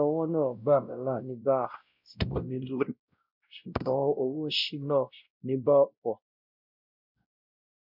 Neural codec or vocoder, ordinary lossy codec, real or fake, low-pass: codec, 16 kHz, 0.5 kbps, X-Codec, WavLM features, trained on Multilingual LibriSpeech; none; fake; 5.4 kHz